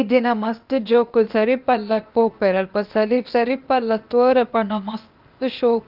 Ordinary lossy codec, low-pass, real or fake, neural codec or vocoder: Opus, 24 kbps; 5.4 kHz; fake; codec, 16 kHz, 0.8 kbps, ZipCodec